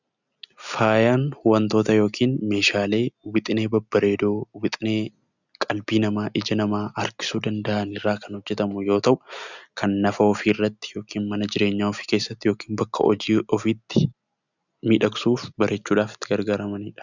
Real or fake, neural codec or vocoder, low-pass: real; none; 7.2 kHz